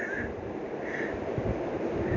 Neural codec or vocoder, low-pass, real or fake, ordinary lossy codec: vocoder, 44.1 kHz, 128 mel bands every 256 samples, BigVGAN v2; 7.2 kHz; fake; none